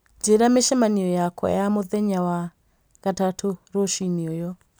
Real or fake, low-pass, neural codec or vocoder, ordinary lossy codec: real; none; none; none